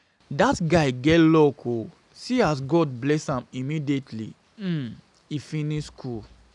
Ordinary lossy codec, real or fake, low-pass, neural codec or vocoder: none; real; 10.8 kHz; none